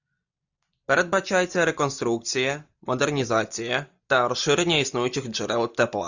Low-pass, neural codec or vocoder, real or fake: 7.2 kHz; none; real